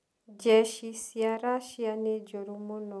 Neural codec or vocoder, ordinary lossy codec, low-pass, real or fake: none; none; none; real